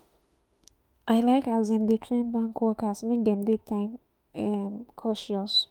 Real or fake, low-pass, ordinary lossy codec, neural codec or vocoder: fake; 19.8 kHz; Opus, 32 kbps; autoencoder, 48 kHz, 32 numbers a frame, DAC-VAE, trained on Japanese speech